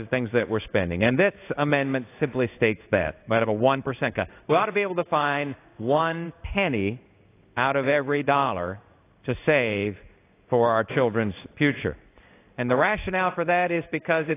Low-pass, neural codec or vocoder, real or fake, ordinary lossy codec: 3.6 kHz; codec, 16 kHz in and 24 kHz out, 1 kbps, XY-Tokenizer; fake; AAC, 24 kbps